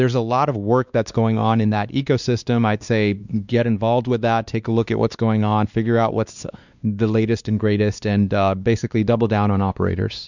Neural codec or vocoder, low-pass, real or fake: codec, 16 kHz, 2 kbps, X-Codec, WavLM features, trained on Multilingual LibriSpeech; 7.2 kHz; fake